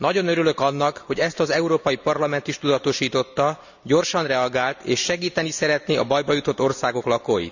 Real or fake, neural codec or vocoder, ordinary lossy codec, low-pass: real; none; none; 7.2 kHz